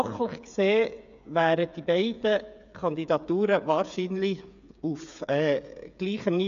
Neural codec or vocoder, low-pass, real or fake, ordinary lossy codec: codec, 16 kHz, 8 kbps, FreqCodec, smaller model; 7.2 kHz; fake; none